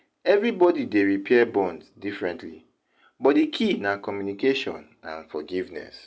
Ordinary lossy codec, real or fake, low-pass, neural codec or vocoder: none; real; none; none